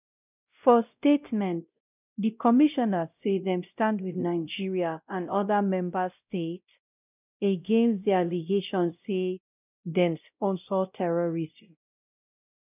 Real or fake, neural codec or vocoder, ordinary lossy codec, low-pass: fake; codec, 16 kHz, 0.5 kbps, X-Codec, WavLM features, trained on Multilingual LibriSpeech; none; 3.6 kHz